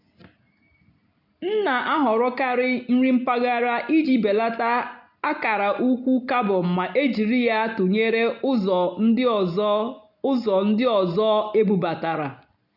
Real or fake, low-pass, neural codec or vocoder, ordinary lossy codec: real; 5.4 kHz; none; none